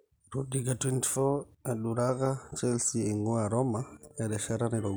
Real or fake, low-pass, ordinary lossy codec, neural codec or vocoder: real; none; none; none